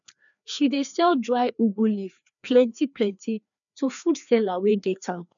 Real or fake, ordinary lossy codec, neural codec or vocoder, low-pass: fake; none; codec, 16 kHz, 2 kbps, FreqCodec, larger model; 7.2 kHz